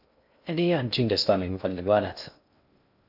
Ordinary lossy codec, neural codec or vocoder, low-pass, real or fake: AAC, 48 kbps; codec, 16 kHz in and 24 kHz out, 0.6 kbps, FocalCodec, streaming, 2048 codes; 5.4 kHz; fake